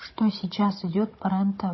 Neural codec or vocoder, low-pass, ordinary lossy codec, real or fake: codec, 16 kHz, 16 kbps, FunCodec, trained on Chinese and English, 50 frames a second; 7.2 kHz; MP3, 24 kbps; fake